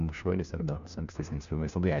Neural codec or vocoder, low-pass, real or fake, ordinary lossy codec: codec, 16 kHz, 1 kbps, FunCodec, trained on LibriTTS, 50 frames a second; 7.2 kHz; fake; AAC, 96 kbps